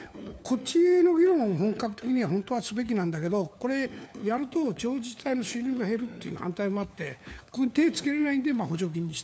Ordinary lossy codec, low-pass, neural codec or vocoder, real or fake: none; none; codec, 16 kHz, 4 kbps, FunCodec, trained on LibriTTS, 50 frames a second; fake